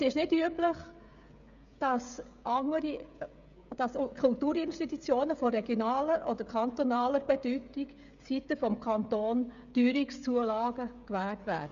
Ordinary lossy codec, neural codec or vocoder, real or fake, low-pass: none; codec, 16 kHz, 16 kbps, FreqCodec, smaller model; fake; 7.2 kHz